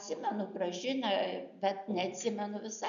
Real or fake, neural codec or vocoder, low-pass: real; none; 7.2 kHz